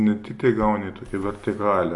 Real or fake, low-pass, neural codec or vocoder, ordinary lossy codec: real; 10.8 kHz; none; MP3, 48 kbps